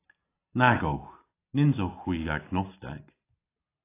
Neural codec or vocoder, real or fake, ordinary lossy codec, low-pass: none; real; AAC, 24 kbps; 3.6 kHz